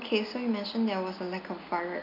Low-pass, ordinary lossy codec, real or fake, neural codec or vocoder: 5.4 kHz; none; real; none